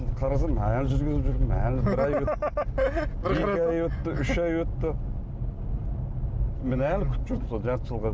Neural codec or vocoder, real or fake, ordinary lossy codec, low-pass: none; real; none; none